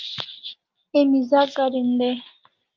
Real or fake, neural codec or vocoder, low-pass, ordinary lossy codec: real; none; 7.2 kHz; Opus, 24 kbps